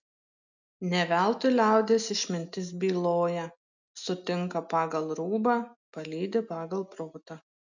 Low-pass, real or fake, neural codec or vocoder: 7.2 kHz; real; none